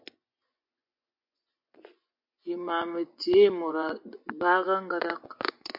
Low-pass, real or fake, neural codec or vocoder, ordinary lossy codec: 5.4 kHz; fake; vocoder, 24 kHz, 100 mel bands, Vocos; MP3, 48 kbps